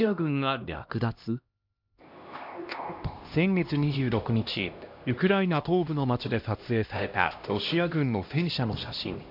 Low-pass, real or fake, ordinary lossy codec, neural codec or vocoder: 5.4 kHz; fake; MP3, 48 kbps; codec, 16 kHz, 1 kbps, X-Codec, HuBERT features, trained on LibriSpeech